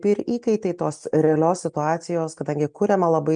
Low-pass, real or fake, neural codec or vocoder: 9.9 kHz; real; none